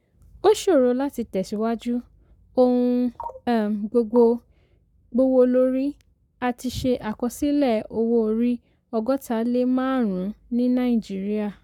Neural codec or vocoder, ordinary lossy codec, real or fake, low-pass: codec, 44.1 kHz, 7.8 kbps, Pupu-Codec; none; fake; 19.8 kHz